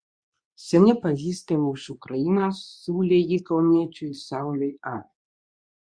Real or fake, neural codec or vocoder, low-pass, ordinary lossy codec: fake; codec, 24 kHz, 0.9 kbps, WavTokenizer, medium speech release version 2; 9.9 kHz; Opus, 64 kbps